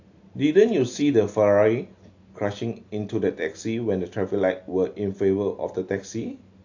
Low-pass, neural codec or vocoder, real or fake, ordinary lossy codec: 7.2 kHz; none; real; AAC, 48 kbps